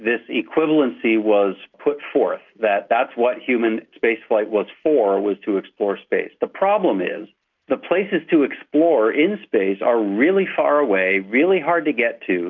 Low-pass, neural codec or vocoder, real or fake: 7.2 kHz; none; real